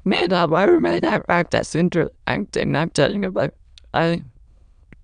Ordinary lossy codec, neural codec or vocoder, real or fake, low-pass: none; autoencoder, 22.05 kHz, a latent of 192 numbers a frame, VITS, trained on many speakers; fake; 9.9 kHz